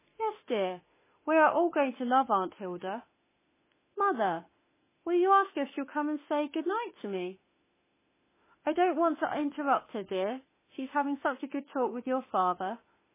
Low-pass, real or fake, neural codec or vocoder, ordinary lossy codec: 3.6 kHz; fake; autoencoder, 48 kHz, 32 numbers a frame, DAC-VAE, trained on Japanese speech; MP3, 16 kbps